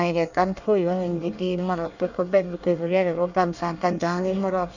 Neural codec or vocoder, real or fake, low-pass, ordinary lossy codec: codec, 24 kHz, 1 kbps, SNAC; fake; 7.2 kHz; none